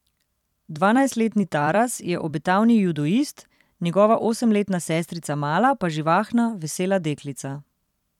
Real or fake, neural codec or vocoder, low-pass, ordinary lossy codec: fake; vocoder, 44.1 kHz, 128 mel bands every 256 samples, BigVGAN v2; 19.8 kHz; none